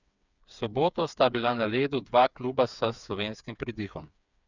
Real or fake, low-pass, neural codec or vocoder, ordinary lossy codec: fake; 7.2 kHz; codec, 16 kHz, 4 kbps, FreqCodec, smaller model; none